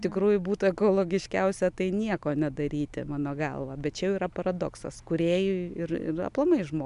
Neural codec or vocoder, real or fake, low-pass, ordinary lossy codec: none; real; 10.8 kHz; MP3, 96 kbps